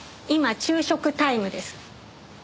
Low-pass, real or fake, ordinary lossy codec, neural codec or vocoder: none; real; none; none